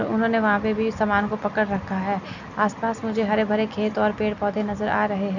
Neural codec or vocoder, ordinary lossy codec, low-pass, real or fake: none; none; 7.2 kHz; real